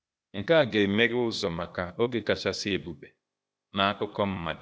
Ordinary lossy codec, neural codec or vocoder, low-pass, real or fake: none; codec, 16 kHz, 0.8 kbps, ZipCodec; none; fake